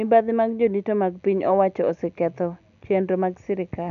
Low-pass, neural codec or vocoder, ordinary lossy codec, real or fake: 7.2 kHz; none; none; real